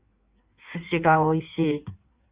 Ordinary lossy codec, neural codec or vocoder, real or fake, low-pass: Opus, 64 kbps; codec, 16 kHz in and 24 kHz out, 1.1 kbps, FireRedTTS-2 codec; fake; 3.6 kHz